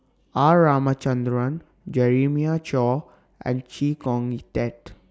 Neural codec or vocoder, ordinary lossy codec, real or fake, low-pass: none; none; real; none